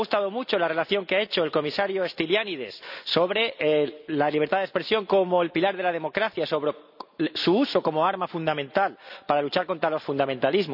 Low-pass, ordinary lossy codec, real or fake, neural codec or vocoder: 5.4 kHz; none; real; none